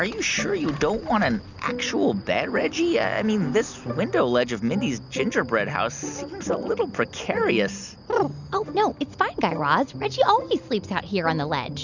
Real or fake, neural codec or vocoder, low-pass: real; none; 7.2 kHz